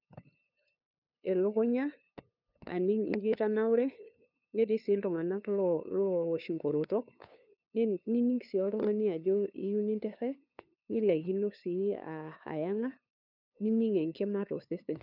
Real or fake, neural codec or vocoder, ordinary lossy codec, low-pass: fake; codec, 16 kHz, 2 kbps, FunCodec, trained on LibriTTS, 25 frames a second; none; 5.4 kHz